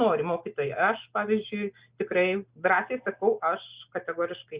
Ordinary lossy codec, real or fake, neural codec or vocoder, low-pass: Opus, 64 kbps; real; none; 3.6 kHz